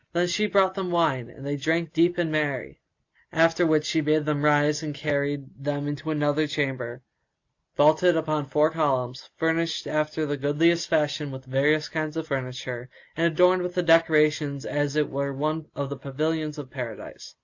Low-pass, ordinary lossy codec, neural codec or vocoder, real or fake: 7.2 kHz; AAC, 48 kbps; none; real